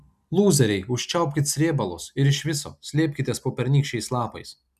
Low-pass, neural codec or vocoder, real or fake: 14.4 kHz; none; real